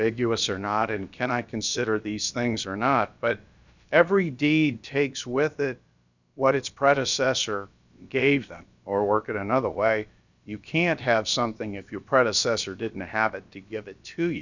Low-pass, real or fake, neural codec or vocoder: 7.2 kHz; fake; codec, 16 kHz, about 1 kbps, DyCAST, with the encoder's durations